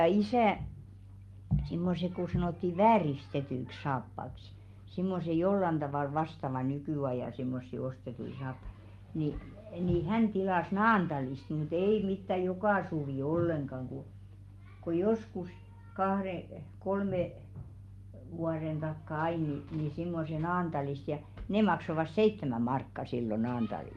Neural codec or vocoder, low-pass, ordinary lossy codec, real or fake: none; 19.8 kHz; Opus, 32 kbps; real